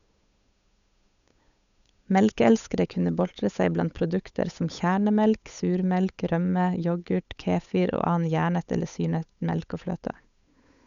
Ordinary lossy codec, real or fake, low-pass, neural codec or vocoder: none; fake; 7.2 kHz; codec, 16 kHz, 8 kbps, FunCodec, trained on Chinese and English, 25 frames a second